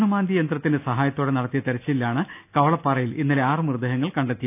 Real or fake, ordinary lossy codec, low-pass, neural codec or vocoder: real; none; 3.6 kHz; none